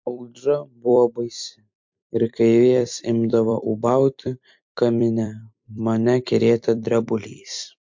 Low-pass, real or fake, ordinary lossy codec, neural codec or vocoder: 7.2 kHz; fake; MP3, 48 kbps; vocoder, 44.1 kHz, 128 mel bands every 256 samples, BigVGAN v2